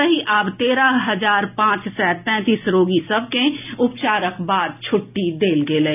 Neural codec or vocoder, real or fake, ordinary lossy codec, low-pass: none; real; none; 3.6 kHz